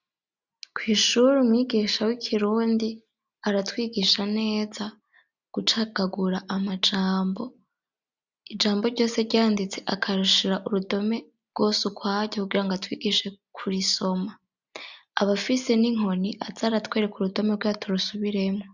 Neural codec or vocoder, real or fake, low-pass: none; real; 7.2 kHz